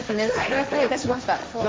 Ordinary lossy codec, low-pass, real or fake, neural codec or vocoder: none; none; fake; codec, 16 kHz, 1.1 kbps, Voila-Tokenizer